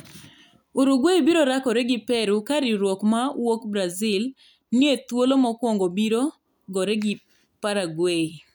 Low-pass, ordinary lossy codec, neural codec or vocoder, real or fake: none; none; none; real